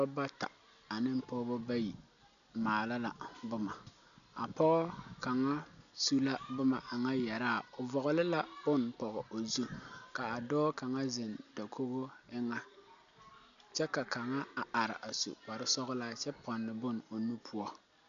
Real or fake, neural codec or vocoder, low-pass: real; none; 7.2 kHz